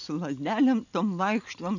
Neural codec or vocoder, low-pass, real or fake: none; 7.2 kHz; real